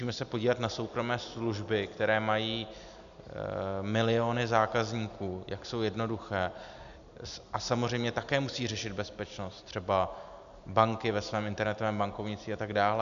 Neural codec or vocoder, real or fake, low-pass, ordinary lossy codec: none; real; 7.2 kHz; MP3, 96 kbps